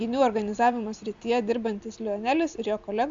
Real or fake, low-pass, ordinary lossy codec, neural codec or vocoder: real; 7.2 kHz; AAC, 64 kbps; none